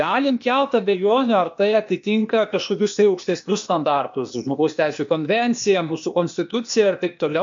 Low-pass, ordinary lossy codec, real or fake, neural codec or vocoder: 7.2 kHz; MP3, 48 kbps; fake; codec, 16 kHz, 0.8 kbps, ZipCodec